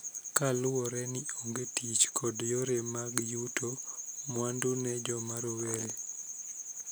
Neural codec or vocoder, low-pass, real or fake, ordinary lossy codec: none; none; real; none